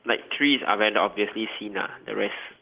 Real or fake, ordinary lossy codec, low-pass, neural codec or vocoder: real; Opus, 16 kbps; 3.6 kHz; none